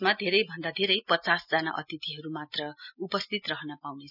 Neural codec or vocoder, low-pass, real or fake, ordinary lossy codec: none; 5.4 kHz; real; none